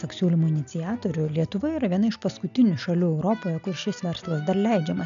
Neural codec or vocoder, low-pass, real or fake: none; 7.2 kHz; real